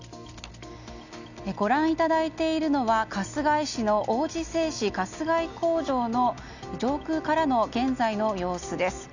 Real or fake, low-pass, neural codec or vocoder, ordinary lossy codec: real; 7.2 kHz; none; none